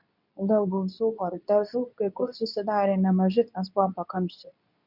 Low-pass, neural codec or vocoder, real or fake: 5.4 kHz; codec, 24 kHz, 0.9 kbps, WavTokenizer, medium speech release version 2; fake